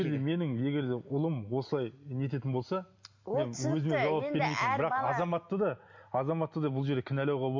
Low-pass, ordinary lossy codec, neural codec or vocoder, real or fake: 7.2 kHz; MP3, 48 kbps; none; real